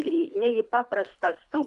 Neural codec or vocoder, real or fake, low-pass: codec, 24 kHz, 3 kbps, HILCodec; fake; 10.8 kHz